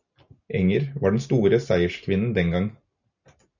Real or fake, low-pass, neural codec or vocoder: real; 7.2 kHz; none